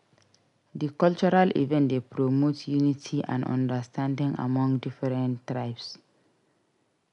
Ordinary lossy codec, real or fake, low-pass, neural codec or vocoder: none; real; 10.8 kHz; none